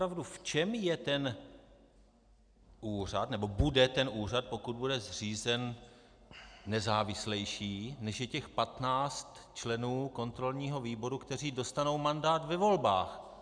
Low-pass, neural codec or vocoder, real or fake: 9.9 kHz; none; real